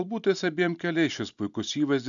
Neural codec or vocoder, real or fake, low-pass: none; real; 7.2 kHz